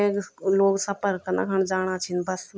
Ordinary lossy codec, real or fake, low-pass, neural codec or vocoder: none; real; none; none